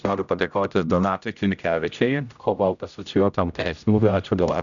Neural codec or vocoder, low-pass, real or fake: codec, 16 kHz, 0.5 kbps, X-Codec, HuBERT features, trained on general audio; 7.2 kHz; fake